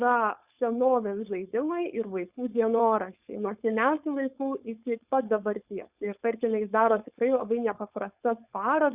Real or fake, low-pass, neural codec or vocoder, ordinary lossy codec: fake; 3.6 kHz; codec, 16 kHz, 4.8 kbps, FACodec; Opus, 64 kbps